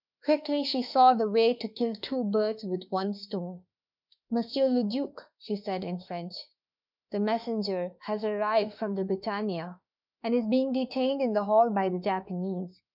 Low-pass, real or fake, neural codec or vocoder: 5.4 kHz; fake; autoencoder, 48 kHz, 32 numbers a frame, DAC-VAE, trained on Japanese speech